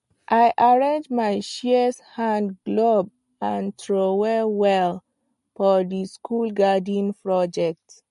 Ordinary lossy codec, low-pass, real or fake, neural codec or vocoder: MP3, 48 kbps; 14.4 kHz; real; none